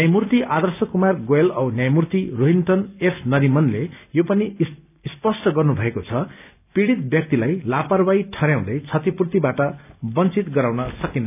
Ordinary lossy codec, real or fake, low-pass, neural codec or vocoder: none; real; 3.6 kHz; none